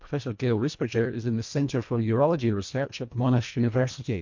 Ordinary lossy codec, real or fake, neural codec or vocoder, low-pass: MP3, 48 kbps; fake; codec, 24 kHz, 1.5 kbps, HILCodec; 7.2 kHz